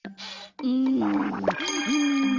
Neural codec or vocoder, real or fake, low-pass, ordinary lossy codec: none; real; 7.2 kHz; Opus, 32 kbps